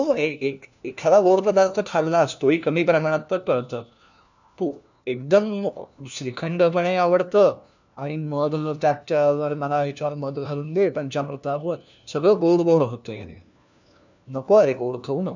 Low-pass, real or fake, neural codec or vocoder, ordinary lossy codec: 7.2 kHz; fake; codec, 16 kHz, 1 kbps, FunCodec, trained on LibriTTS, 50 frames a second; none